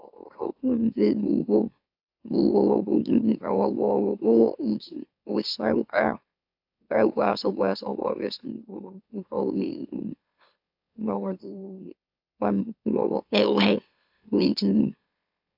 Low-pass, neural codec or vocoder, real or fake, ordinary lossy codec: 5.4 kHz; autoencoder, 44.1 kHz, a latent of 192 numbers a frame, MeloTTS; fake; AAC, 48 kbps